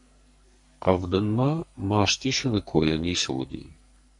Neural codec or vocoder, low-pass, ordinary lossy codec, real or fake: codec, 44.1 kHz, 2.6 kbps, SNAC; 10.8 kHz; MP3, 64 kbps; fake